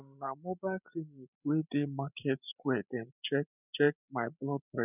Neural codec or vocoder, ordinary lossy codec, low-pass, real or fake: codec, 16 kHz, 16 kbps, FreqCodec, larger model; none; 3.6 kHz; fake